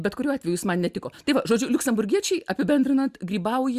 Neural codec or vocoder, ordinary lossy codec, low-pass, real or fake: none; Opus, 64 kbps; 14.4 kHz; real